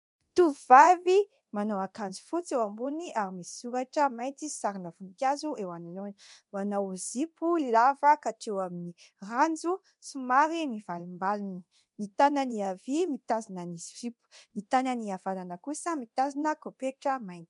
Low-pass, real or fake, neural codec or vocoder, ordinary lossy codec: 10.8 kHz; fake; codec, 24 kHz, 0.9 kbps, DualCodec; MP3, 64 kbps